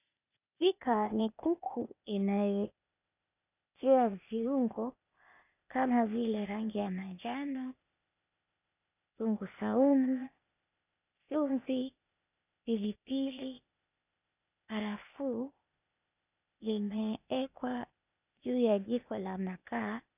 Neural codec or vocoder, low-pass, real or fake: codec, 16 kHz, 0.8 kbps, ZipCodec; 3.6 kHz; fake